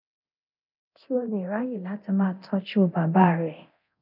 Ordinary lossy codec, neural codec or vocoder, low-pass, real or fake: none; codec, 24 kHz, 0.9 kbps, DualCodec; 5.4 kHz; fake